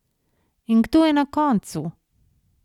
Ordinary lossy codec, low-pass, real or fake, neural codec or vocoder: none; 19.8 kHz; real; none